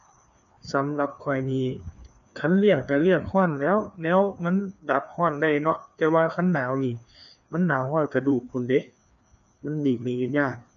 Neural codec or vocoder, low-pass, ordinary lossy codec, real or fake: codec, 16 kHz, 2 kbps, FreqCodec, larger model; 7.2 kHz; none; fake